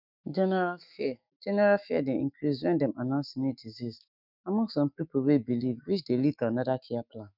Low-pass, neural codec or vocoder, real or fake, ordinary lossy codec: 5.4 kHz; autoencoder, 48 kHz, 128 numbers a frame, DAC-VAE, trained on Japanese speech; fake; none